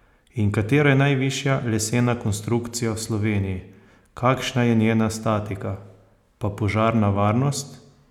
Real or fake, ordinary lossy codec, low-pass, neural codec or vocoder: real; none; 19.8 kHz; none